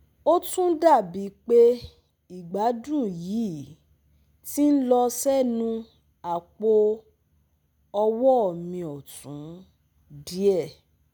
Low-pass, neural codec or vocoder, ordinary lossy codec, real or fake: none; none; none; real